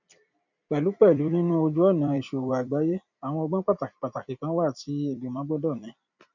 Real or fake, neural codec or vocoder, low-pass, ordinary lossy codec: fake; vocoder, 44.1 kHz, 128 mel bands every 256 samples, BigVGAN v2; 7.2 kHz; none